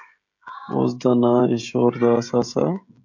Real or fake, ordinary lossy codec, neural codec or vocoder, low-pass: fake; MP3, 48 kbps; codec, 16 kHz, 16 kbps, FreqCodec, smaller model; 7.2 kHz